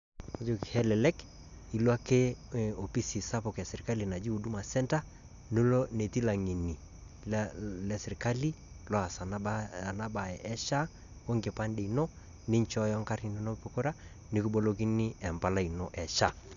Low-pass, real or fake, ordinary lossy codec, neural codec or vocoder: 7.2 kHz; real; none; none